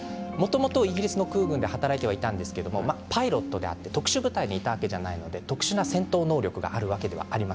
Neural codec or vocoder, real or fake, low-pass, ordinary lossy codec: none; real; none; none